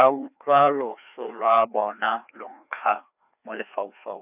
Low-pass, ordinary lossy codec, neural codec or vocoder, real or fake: 3.6 kHz; none; codec, 16 kHz, 2 kbps, FreqCodec, larger model; fake